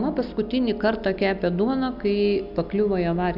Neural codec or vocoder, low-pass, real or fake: none; 5.4 kHz; real